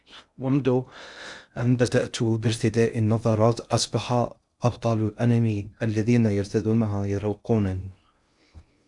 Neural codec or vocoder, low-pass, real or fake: codec, 16 kHz in and 24 kHz out, 0.6 kbps, FocalCodec, streaming, 2048 codes; 10.8 kHz; fake